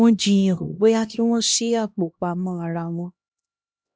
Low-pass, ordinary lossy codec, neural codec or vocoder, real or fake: none; none; codec, 16 kHz, 1 kbps, X-Codec, HuBERT features, trained on LibriSpeech; fake